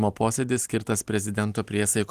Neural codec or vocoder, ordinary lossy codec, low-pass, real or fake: none; Opus, 24 kbps; 14.4 kHz; real